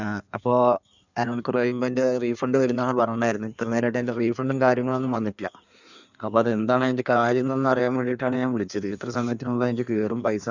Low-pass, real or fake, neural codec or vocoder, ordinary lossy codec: 7.2 kHz; fake; codec, 16 kHz in and 24 kHz out, 1.1 kbps, FireRedTTS-2 codec; none